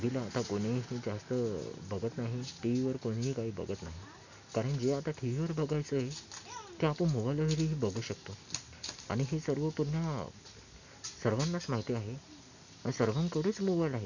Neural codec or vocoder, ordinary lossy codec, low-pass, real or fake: none; none; 7.2 kHz; real